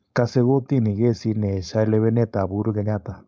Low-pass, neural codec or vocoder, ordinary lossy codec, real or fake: none; codec, 16 kHz, 4.8 kbps, FACodec; none; fake